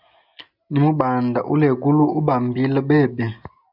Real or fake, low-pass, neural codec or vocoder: real; 5.4 kHz; none